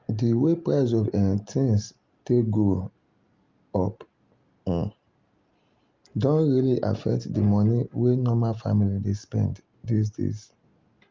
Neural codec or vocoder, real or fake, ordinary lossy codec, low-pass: none; real; Opus, 24 kbps; 7.2 kHz